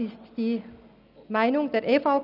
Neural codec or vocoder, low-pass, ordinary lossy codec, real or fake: none; 5.4 kHz; none; real